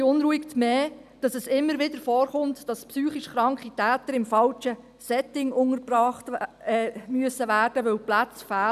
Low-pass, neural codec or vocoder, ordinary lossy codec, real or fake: 14.4 kHz; none; none; real